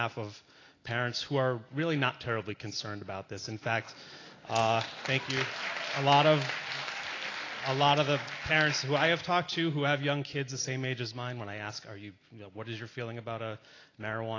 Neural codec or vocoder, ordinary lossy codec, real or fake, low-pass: none; AAC, 32 kbps; real; 7.2 kHz